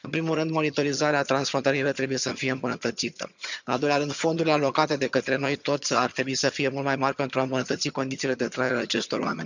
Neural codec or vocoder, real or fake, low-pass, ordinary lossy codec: vocoder, 22.05 kHz, 80 mel bands, HiFi-GAN; fake; 7.2 kHz; none